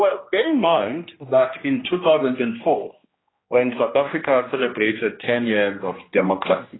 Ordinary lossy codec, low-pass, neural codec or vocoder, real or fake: AAC, 16 kbps; 7.2 kHz; codec, 16 kHz, 1 kbps, X-Codec, HuBERT features, trained on general audio; fake